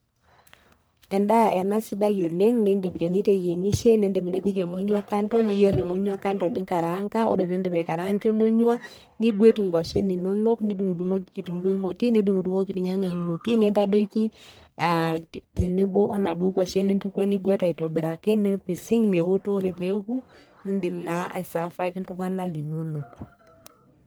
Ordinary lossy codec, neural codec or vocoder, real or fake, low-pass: none; codec, 44.1 kHz, 1.7 kbps, Pupu-Codec; fake; none